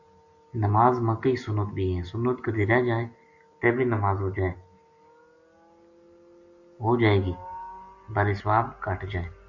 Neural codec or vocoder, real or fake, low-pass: none; real; 7.2 kHz